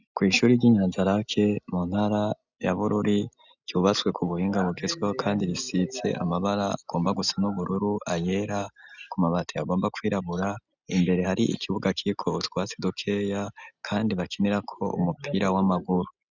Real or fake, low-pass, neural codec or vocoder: real; 7.2 kHz; none